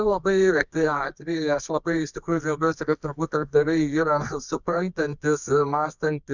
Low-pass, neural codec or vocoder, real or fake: 7.2 kHz; codec, 24 kHz, 0.9 kbps, WavTokenizer, medium music audio release; fake